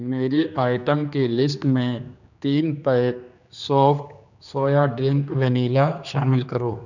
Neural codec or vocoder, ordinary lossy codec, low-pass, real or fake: codec, 16 kHz, 2 kbps, X-Codec, HuBERT features, trained on general audio; none; 7.2 kHz; fake